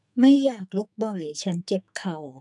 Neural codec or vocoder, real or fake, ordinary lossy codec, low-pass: codec, 44.1 kHz, 2.6 kbps, SNAC; fake; none; 10.8 kHz